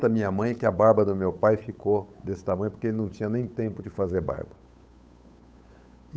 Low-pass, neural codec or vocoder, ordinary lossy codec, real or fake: none; codec, 16 kHz, 8 kbps, FunCodec, trained on Chinese and English, 25 frames a second; none; fake